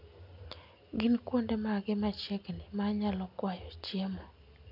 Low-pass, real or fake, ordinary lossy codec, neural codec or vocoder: 5.4 kHz; real; none; none